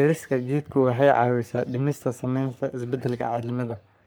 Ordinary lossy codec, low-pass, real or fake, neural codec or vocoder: none; none; fake; codec, 44.1 kHz, 3.4 kbps, Pupu-Codec